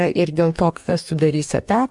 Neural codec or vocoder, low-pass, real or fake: codec, 44.1 kHz, 2.6 kbps, DAC; 10.8 kHz; fake